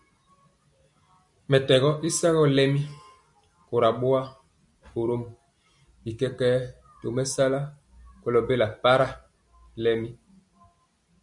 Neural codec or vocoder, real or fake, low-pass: none; real; 10.8 kHz